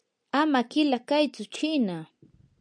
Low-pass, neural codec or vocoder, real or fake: 9.9 kHz; none; real